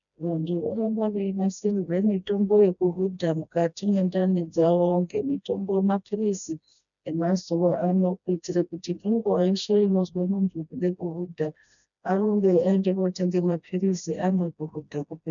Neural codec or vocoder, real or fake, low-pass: codec, 16 kHz, 1 kbps, FreqCodec, smaller model; fake; 7.2 kHz